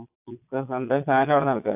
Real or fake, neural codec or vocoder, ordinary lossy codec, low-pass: fake; vocoder, 22.05 kHz, 80 mel bands, Vocos; AAC, 32 kbps; 3.6 kHz